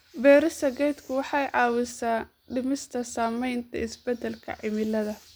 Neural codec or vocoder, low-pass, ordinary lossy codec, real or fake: none; none; none; real